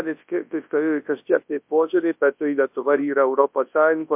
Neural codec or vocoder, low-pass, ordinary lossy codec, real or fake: codec, 24 kHz, 0.9 kbps, WavTokenizer, large speech release; 3.6 kHz; MP3, 32 kbps; fake